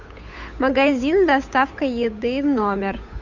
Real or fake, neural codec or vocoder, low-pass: fake; codec, 16 kHz, 8 kbps, FunCodec, trained on Chinese and English, 25 frames a second; 7.2 kHz